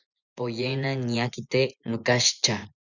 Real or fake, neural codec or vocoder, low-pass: fake; vocoder, 24 kHz, 100 mel bands, Vocos; 7.2 kHz